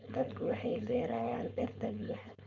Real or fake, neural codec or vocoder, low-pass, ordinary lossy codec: fake; codec, 16 kHz, 4.8 kbps, FACodec; 7.2 kHz; none